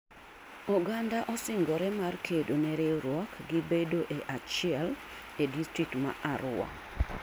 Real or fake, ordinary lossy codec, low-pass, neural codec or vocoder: real; none; none; none